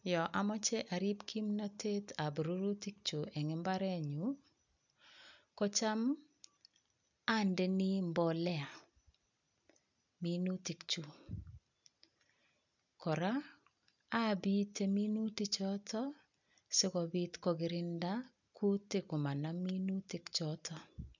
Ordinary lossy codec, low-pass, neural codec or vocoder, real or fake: none; 7.2 kHz; none; real